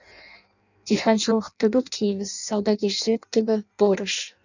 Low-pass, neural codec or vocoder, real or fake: 7.2 kHz; codec, 16 kHz in and 24 kHz out, 0.6 kbps, FireRedTTS-2 codec; fake